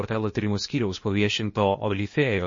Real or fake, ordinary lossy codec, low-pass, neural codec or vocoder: fake; MP3, 32 kbps; 7.2 kHz; codec, 16 kHz, 0.8 kbps, ZipCodec